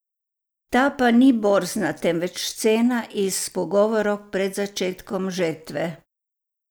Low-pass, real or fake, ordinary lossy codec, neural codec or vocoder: none; real; none; none